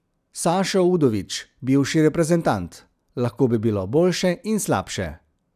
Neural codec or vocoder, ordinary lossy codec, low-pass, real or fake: none; none; 14.4 kHz; real